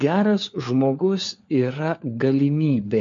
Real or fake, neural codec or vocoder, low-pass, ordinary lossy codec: fake; codec, 16 kHz, 4 kbps, FunCodec, trained on LibriTTS, 50 frames a second; 7.2 kHz; MP3, 48 kbps